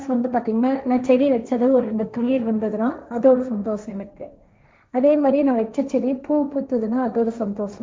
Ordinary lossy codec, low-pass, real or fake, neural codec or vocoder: none; none; fake; codec, 16 kHz, 1.1 kbps, Voila-Tokenizer